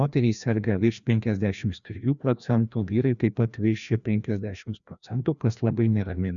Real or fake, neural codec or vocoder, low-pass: fake; codec, 16 kHz, 1 kbps, FreqCodec, larger model; 7.2 kHz